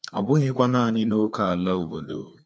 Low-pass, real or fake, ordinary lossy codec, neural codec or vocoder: none; fake; none; codec, 16 kHz, 2 kbps, FreqCodec, larger model